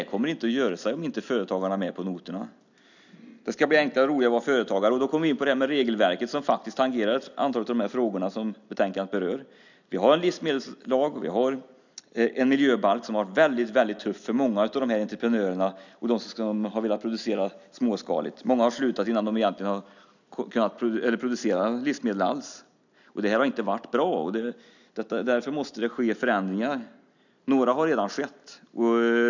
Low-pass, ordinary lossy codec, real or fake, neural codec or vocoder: 7.2 kHz; none; real; none